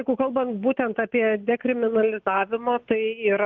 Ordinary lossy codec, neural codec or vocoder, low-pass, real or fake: Opus, 24 kbps; none; 7.2 kHz; real